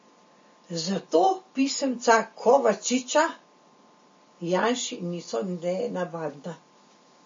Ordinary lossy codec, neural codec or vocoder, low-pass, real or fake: MP3, 32 kbps; none; 7.2 kHz; real